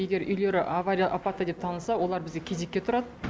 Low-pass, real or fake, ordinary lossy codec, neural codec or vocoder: none; real; none; none